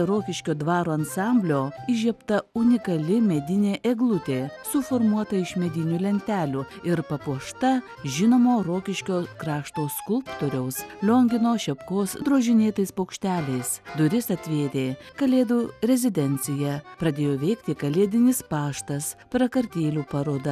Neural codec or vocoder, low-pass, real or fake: none; 14.4 kHz; real